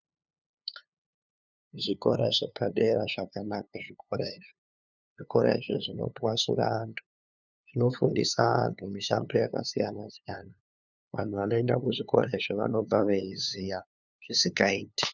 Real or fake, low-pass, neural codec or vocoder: fake; 7.2 kHz; codec, 16 kHz, 2 kbps, FunCodec, trained on LibriTTS, 25 frames a second